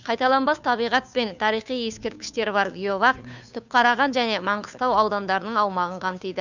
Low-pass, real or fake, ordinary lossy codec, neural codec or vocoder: 7.2 kHz; fake; none; codec, 16 kHz, 2 kbps, FunCodec, trained on Chinese and English, 25 frames a second